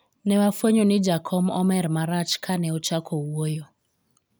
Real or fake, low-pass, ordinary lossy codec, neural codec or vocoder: real; none; none; none